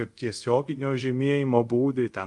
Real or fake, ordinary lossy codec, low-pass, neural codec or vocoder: fake; Opus, 32 kbps; 10.8 kHz; codec, 24 kHz, 0.5 kbps, DualCodec